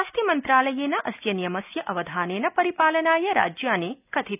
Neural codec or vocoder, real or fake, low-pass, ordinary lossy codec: none; real; 3.6 kHz; none